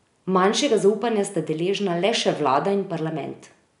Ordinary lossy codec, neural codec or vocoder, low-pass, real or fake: MP3, 96 kbps; none; 10.8 kHz; real